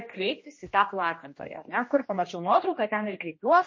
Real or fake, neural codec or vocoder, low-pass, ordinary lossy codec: fake; codec, 16 kHz, 1 kbps, X-Codec, HuBERT features, trained on general audio; 7.2 kHz; MP3, 32 kbps